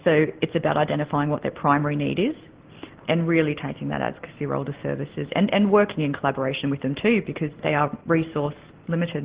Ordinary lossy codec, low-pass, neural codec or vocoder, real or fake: Opus, 16 kbps; 3.6 kHz; none; real